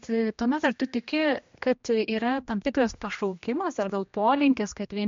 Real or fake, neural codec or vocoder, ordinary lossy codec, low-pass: fake; codec, 16 kHz, 1 kbps, X-Codec, HuBERT features, trained on general audio; MP3, 48 kbps; 7.2 kHz